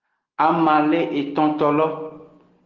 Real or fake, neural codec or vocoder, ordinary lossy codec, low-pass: real; none; Opus, 16 kbps; 7.2 kHz